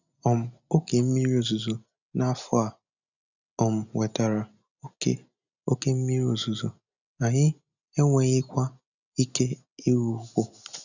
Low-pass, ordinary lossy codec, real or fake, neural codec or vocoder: 7.2 kHz; none; real; none